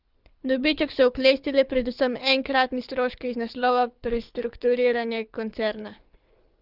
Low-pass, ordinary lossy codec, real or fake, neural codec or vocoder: 5.4 kHz; Opus, 24 kbps; fake; codec, 24 kHz, 6 kbps, HILCodec